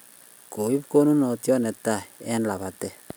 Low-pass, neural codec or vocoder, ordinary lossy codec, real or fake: none; none; none; real